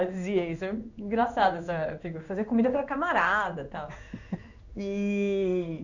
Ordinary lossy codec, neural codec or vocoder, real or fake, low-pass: none; codec, 16 kHz in and 24 kHz out, 1 kbps, XY-Tokenizer; fake; 7.2 kHz